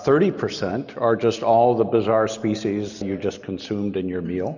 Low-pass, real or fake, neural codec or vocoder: 7.2 kHz; real; none